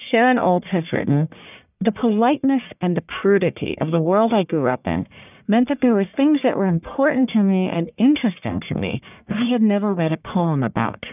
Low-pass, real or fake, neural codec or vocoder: 3.6 kHz; fake; codec, 44.1 kHz, 1.7 kbps, Pupu-Codec